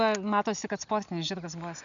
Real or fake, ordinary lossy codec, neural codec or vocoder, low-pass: real; MP3, 96 kbps; none; 7.2 kHz